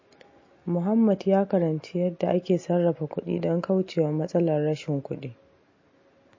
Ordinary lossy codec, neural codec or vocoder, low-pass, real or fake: MP3, 32 kbps; none; 7.2 kHz; real